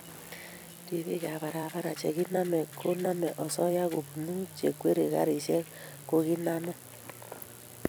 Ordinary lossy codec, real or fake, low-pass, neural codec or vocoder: none; fake; none; vocoder, 44.1 kHz, 128 mel bands every 256 samples, BigVGAN v2